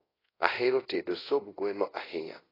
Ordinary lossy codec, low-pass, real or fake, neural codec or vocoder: AAC, 24 kbps; 5.4 kHz; fake; codec, 24 kHz, 0.5 kbps, DualCodec